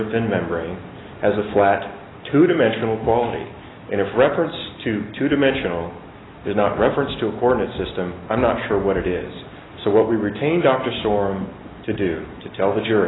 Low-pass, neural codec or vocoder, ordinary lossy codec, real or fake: 7.2 kHz; none; AAC, 16 kbps; real